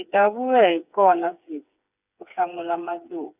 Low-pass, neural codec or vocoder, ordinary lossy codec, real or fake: 3.6 kHz; codec, 16 kHz, 4 kbps, FreqCodec, smaller model; none; fake